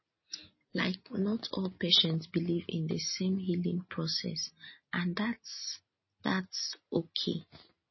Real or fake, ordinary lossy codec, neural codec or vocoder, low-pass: real; MP3, 24 kbps; none; 7.2 kHz